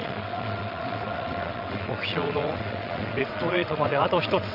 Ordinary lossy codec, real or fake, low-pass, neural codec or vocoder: none; fake; 5.4 kHz; vocoder, 22.05 kHz, 80 mel bands, WaveNeXt